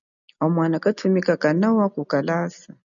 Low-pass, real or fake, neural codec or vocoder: 7.2 kHz; real; none